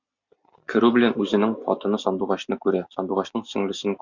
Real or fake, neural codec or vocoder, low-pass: real; none; 7.2 kHz